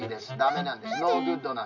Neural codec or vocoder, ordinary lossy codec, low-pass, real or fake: none; none; 7.2 kHz; real